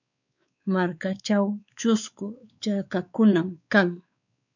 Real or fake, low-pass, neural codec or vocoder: fake; 7.2 kHz; codec, 16 kHz, 4 kbps, X-Codec, WavLM features, trained on Multilingual LibriSpeech